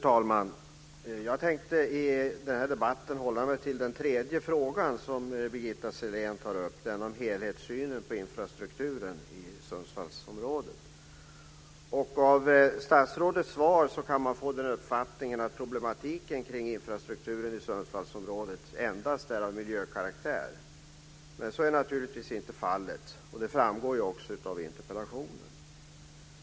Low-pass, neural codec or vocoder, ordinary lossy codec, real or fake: none; none; none; real